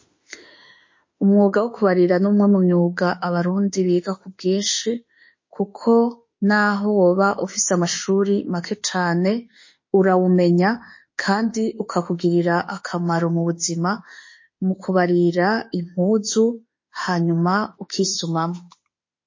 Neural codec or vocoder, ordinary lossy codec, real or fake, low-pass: autoencoder, 48 kHz, 32 numbers a frame, DAC-VAE, trained on Japanese speech; MP3, 32 kbps; fake; 7.2 kHz